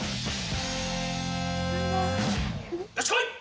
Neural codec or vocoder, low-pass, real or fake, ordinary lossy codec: none; none; real; none